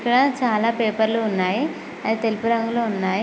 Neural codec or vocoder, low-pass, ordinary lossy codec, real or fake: none; none; none; real